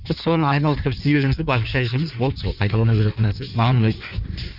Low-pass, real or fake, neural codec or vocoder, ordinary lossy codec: 5.4 kHz; fake; codec, 16 kHz in and 24 kHz out, 1.1 kbps, FireRedTTS-2 codec; none